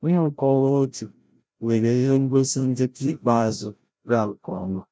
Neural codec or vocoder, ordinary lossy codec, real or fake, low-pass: codec, 16 kHz, 0.5 kbps, FreqCodec, larger model; none; fake; none